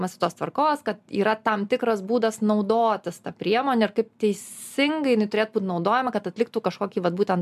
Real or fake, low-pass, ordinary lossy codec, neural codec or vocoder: real; 14.4 kHz; MP3, 96 kbps; none